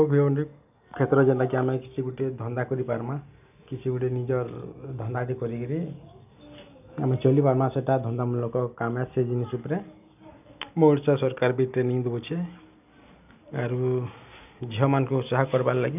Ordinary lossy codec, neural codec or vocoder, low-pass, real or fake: none; none; 3.6 kHz; real